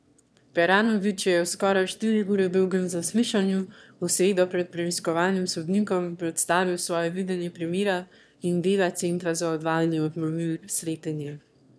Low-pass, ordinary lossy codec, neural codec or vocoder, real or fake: none; none; autoencoder, 22.05 kHz, a latent of 192 numbers a frame, VITS, trained on one speaker; fake